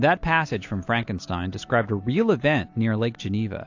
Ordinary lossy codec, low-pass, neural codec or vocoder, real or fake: AAC, 48 kbps; 7.2 kHz; none; real